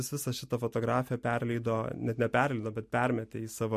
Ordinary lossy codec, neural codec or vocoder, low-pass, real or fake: MP3, 64 kbps; none; 14.4 kHz; real